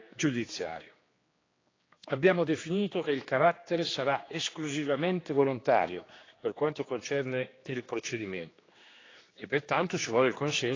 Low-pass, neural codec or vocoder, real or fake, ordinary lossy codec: 7.2 kHz; codec, 16 kHz, 2 kbps, X-Codec, HuBERT features, trained on general audio; fake; AAC, 32 kbps